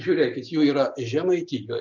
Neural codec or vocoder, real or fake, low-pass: none; real; 7.2 kHz